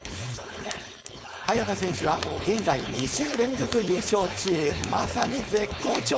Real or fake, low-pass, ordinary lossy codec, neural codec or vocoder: fake; none; none; codec, 16 kHz, 4.8 kbps, FACodec